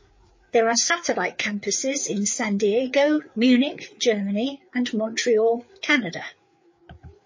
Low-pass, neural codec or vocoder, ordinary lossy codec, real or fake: 7.2 kHz; codec, 16 kHz, 4 kbps, FreqCodec, larger model; MP3, 32 kbps; fake